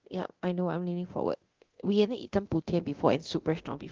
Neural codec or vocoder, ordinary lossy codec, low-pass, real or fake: codec, 24 kHz, 0.9 kbps, DualCodec; Opus, 16 kbps; 7.2 kHz; fake